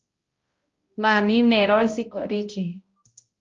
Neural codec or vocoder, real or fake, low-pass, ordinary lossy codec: codec, 16 kHz, 0.5 kbps, X-Codec, HuBERT features, trained on balanced general audio; fake; 7.2 kHz; Opus, 32 kbps